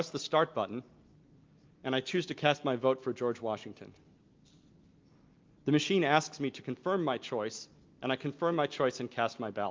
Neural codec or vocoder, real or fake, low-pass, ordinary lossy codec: none; real; 7.2 kHz; Opus, 24 kbps